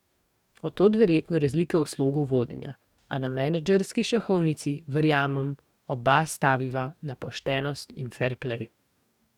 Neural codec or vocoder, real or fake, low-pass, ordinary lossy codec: codec, 44.1 kHz, 2.6 kbps, DAC; fake; 19.8 kHz; none